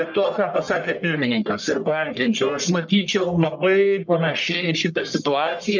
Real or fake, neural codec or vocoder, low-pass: fake; codec, 44.1 kHz, 1.7 kbps, Pupu-Codec; 7.2 kHz